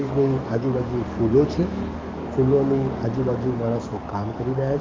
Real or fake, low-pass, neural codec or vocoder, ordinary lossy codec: fake; 7.2 kHz; codec, 44.1 kHz, 7.8 kbps, DAC; Opus, 24 kbps